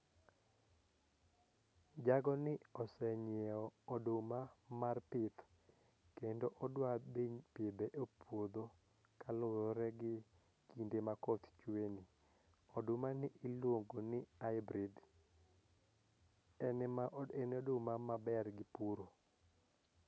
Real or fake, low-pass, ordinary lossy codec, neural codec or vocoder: real; none; none; none